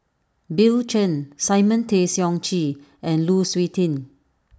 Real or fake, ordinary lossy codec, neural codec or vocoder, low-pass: real; none; none; none